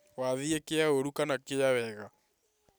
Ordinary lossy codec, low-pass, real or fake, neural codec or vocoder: none; none; real; none